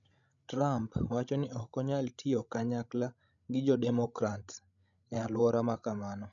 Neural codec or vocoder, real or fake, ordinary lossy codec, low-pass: codec, 16 kHz, 16 kbps, FreqCodec, larger model; fake; none; 7.2 kHz